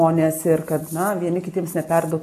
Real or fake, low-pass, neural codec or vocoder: real; 14.4 kHz; none